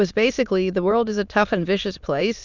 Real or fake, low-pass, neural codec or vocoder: fake; 7.2 kHz; autoencoder, 22.05 kHz, a latent of 192 numbers a frame, VITS, trained on many speakers